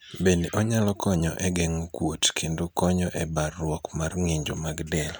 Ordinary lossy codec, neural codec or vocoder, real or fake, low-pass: none; none; real; none